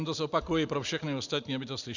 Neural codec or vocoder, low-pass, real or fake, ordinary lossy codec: none; 7.2 kHz; real; Opus, 64 kbps